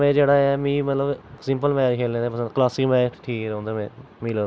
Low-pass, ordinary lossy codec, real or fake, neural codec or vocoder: none; none; real; none